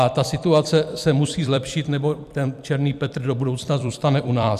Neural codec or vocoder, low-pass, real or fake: vocoder, 44.1 kHz, 128 mel bands every 256 samples, BigVGAN v2; 14.4 kHz; fake